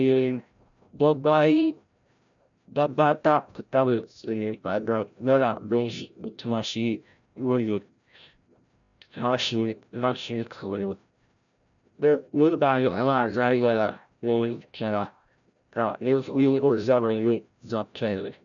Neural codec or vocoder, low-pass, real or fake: codec, 16 kHz, 0.5 kbps, FreqCodec, larger model; 7.2 kHz; fake